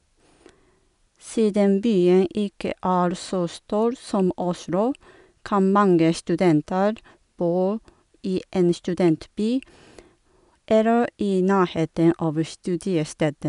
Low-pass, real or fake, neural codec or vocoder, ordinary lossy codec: 10.8 kHz; real; none; none